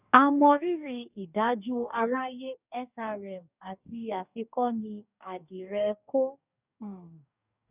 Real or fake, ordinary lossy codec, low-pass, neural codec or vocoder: fake; none; 3.6 kHz; codec, 44.1 kHz, 2.6 kbps, DAC